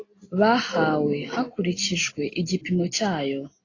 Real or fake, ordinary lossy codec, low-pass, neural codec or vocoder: real; AAC, 32 kbps; 7.2 kHz; none